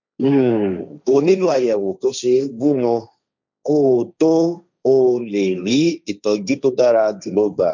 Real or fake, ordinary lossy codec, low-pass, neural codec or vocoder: fake; none; 7.2 kHz; codec, 16 kHz, 1.1 kbps, Voila-Tokenizer